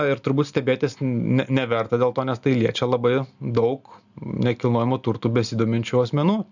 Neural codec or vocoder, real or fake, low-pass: none; real; 7.2 kHz